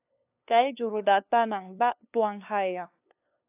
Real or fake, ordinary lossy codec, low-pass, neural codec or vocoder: fake; AAC, 32 kbps; 3.6 kHz; codec, 16 kHz, 2 kbps, FunCodec, trained on LibriTTS, 25 frames a second